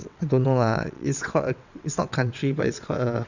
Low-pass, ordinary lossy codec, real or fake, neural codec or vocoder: 7.2 kHz; none; fake; vocoder, 22.05 kHz, 80 mel bands, WaveNeXt